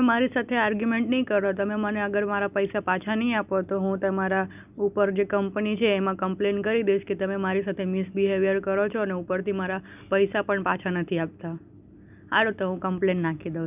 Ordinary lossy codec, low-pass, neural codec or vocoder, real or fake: none; 3.6 kHz; none; real